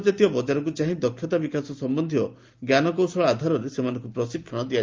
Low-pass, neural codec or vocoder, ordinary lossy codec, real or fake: 7.2 kHz; none; Opus, 32 kbps; real